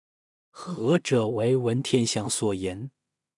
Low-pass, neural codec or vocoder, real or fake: 10.8 kHz; codec, 16 kHz in and 24 kHz out, 0.4 kbps, LongCat-Audio-Codec, two codebook decoder; fake